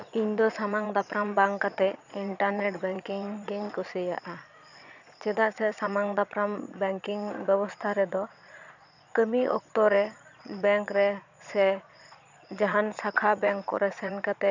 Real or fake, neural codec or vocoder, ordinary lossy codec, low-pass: fake; vocoder, 22.05 kHz, 80 mel bands, Vocos; none; 7.2 kHz